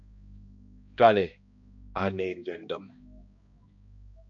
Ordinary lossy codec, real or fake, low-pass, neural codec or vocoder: MP3, 48 kbps; fake; 7.2 kHz; codec, 16 kHz, 1 kbps, X-Codec, HuBERT features, trained on balanced general audio